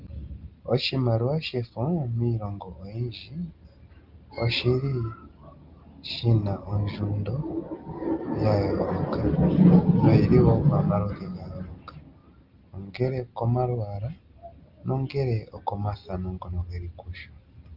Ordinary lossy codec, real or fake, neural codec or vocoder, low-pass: Opus, 32 kbps; real; none; 5.4 kHz